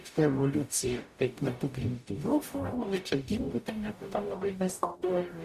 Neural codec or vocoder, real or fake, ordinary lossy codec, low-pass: codec, 44.1 kHz, 0.9 kbps, DAC; fake; Opus, 64 kbps; 14.4 kHz